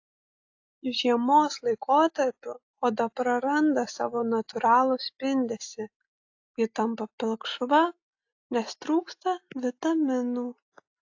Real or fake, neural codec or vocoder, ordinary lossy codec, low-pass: real; none; AAC, 48 kbps; 7.2 kHz